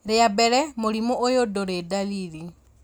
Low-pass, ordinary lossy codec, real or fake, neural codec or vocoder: none; none; real; none